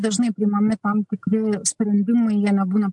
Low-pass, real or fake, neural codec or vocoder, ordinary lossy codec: 10.8 kHz; real; none; MP3, 64 kbps